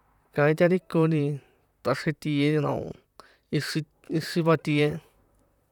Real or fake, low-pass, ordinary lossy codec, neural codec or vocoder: fake; 19.8 kHz; none; vocoder, 44.1 kHz, 128 mel bands, Pupu-Vocoder